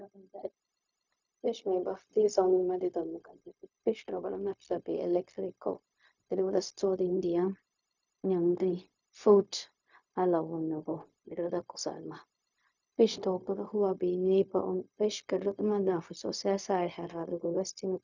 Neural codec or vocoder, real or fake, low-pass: codec, 16 kHz, 0.4 kbps, LongCat-Audio-Codec; fake; 7.2 kHz